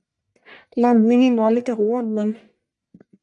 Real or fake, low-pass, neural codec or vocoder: fake; 10.8 kHz; codec, 44.1 kHz, 1.7 kbps, Pupu-Codec